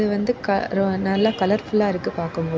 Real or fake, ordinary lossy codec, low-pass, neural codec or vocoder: real; none; none; none